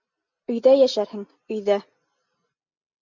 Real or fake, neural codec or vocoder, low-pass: real; none; 7.2 kHz